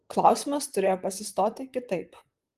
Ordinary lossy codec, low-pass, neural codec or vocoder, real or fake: Opus, 24 kbps; 14.4 kHz; vocoder, 44.1 kHz, 128 mel bands, Pupu-Vocoder; fake